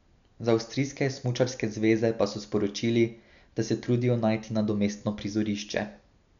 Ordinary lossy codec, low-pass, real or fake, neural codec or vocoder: none; 7.2 kHz; real; none